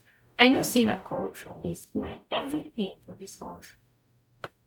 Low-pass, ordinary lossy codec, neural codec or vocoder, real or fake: 19.8 kHz; none; codec, 44.1 kHz, 0.9 kbps, DAC; fake